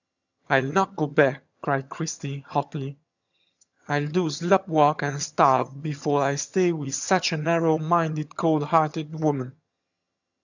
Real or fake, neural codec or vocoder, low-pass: fake; vocoder, 22.05 kHz, 80 mel bands, HiFi-GAN; 7.2 kHz